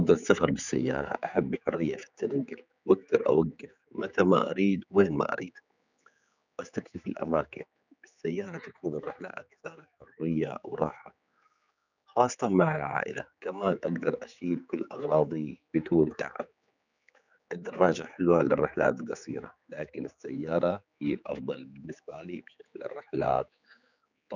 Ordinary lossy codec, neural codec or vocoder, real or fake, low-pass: none; codec, 16 kHz, 4 kbps, X-Codec, HuBERT features, trained on general audio; fake; 7.2 kHz